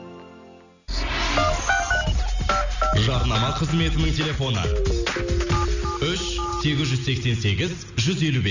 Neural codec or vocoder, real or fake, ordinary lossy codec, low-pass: none; real; none; 7.2 kHz